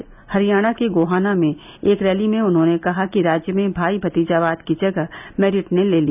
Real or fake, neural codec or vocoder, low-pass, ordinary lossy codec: real; none; 3.6 kHz; none